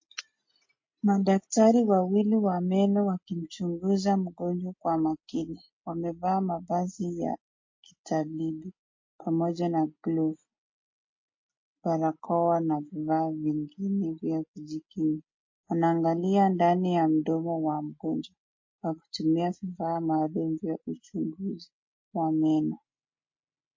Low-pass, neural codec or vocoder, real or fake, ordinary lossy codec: 7.2 kHz; none; real; MP3, 32 kbps